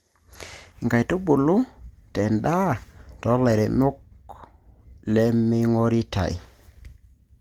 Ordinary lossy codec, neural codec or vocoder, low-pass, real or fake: Opus, 24 kbps; none; 19.8 kHz; real